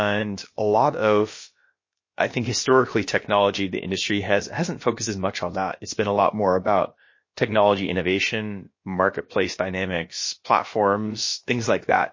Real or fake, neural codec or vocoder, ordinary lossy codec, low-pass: fake; codec, 16 kHz, about 1 kbps, DyCAST, with the encoder's durations; MP3, 32 kbps; 7.2 kHz